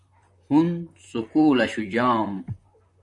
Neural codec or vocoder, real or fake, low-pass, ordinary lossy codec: vocoder, 44.1 kHz, 128 mel bands, Pupu-Vocoder; fake; 10.8 kHz; AAC, 64 kbps